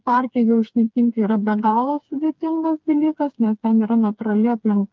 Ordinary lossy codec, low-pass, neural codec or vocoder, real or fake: Opus, 32 kbps; 7.2 kHz; codec, 16 kHz, 4 kbps, FreqCodec, smaller model; fake